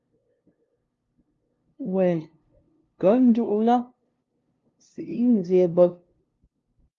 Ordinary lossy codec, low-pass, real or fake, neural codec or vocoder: Opus, 32 kbps; 7.2 kHz; fake; codec, 16 kHz, 0.5 kbps, FunCodec, trained on LibriTTS, 25 frames a second